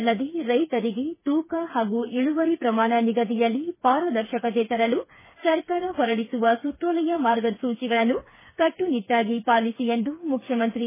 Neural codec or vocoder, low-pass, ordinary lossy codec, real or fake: codec, 16 kHz, 4 kbps, FreqCodec, smaller model; 3.6 kHz; MP3, 16 kbps; fake